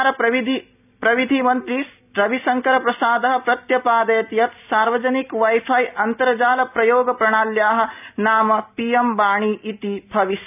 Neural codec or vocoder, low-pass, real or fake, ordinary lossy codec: none; 3.6 kHz; real; none